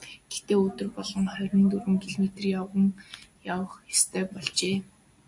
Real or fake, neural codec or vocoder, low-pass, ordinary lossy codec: real; none; 10.8 kHz; AAC, 64 kbps